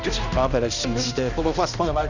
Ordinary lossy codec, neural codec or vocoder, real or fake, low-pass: none; codec, 16 kHz, 1 kbps, X-Codec, HuBERT features, trained on balanced general audio; fake; 7.2 kHz